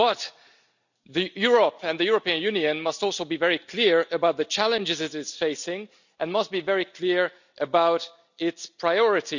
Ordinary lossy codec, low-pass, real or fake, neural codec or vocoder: none; 7.2 kHz; real; none